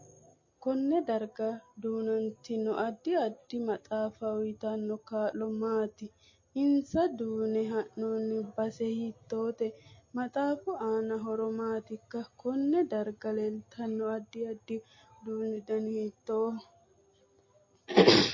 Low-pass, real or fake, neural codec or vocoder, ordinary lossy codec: 7.2 kHz; real; none; MP3, 32 kbps